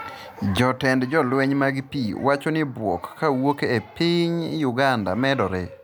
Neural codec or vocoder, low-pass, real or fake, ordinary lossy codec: none; none; real; none